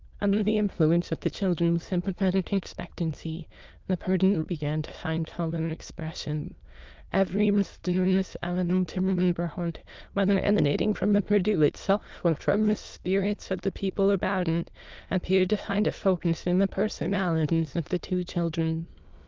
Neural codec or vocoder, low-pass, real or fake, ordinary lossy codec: autoencoder, 22.05 kHz, a latent of 192 numbers a frame, VITS, trained on many speakers; 7.2 kHz; fake; Opus, 24 kbps